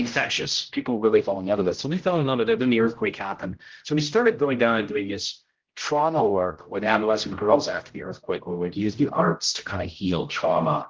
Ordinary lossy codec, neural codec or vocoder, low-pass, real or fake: Opus, 16 kbps; codec, 16 kHz, 0.5 kbps, X-Codec, HuBERT features, trained on general audio; 7.2 kHz; fake